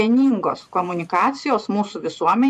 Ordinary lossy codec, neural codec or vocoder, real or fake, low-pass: AAC, 96 kbps; vocoder, 44.1 kHz, 128 mel bands every 512 samples, BigVGAN v2; fake; 14.4 kHz